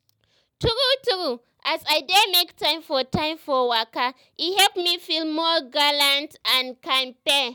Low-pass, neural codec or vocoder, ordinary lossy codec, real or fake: none; none; none; real